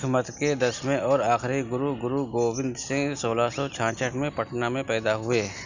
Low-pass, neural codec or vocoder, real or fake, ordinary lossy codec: 7.2 kHz; none; real; none